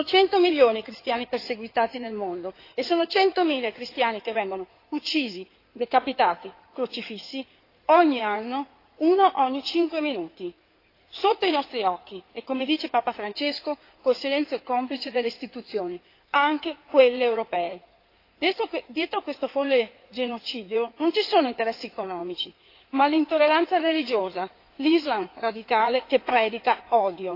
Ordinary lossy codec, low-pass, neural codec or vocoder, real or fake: AAC, 32 kbps; 5.4 kHz; codec, 16 kHz in and 24 kHz out, 2.2 kbps, FireRedTTS-2 codec; fake